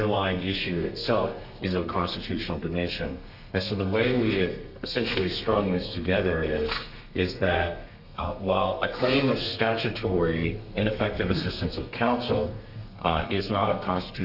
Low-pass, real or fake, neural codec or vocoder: 5.4 kHz; fake; codec, 32 kHz, 1.9 kbps, SNAC